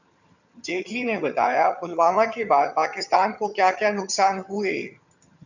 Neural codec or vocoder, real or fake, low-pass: vocoder, 22.05 kHz, 80 mel bands, HiFi-GAN; fake; 7.2 kHz